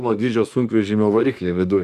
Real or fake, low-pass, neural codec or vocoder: fake; 14.4 kHz; autoencoder, 48 kHz, 32 numbers a frame, DAC-VAE, trained on Japanese speech